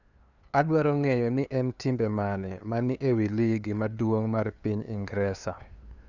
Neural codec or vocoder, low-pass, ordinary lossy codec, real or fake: codec, 16 kHz, 2 kbps, FunCodec, trained on LibriTTS, 25 frames a second; 7.2 kHz; none; fake